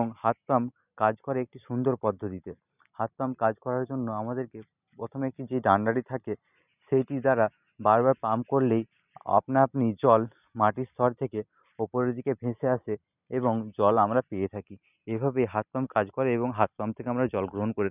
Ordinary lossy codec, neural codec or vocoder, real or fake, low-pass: none; none; real; 3.6 kHz